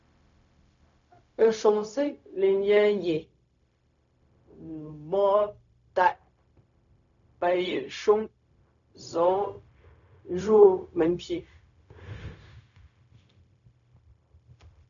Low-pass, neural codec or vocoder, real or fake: 7.2 kHz; codec, 16 kHz, 0.4 kbps, LongCat-Audio-Codec; fake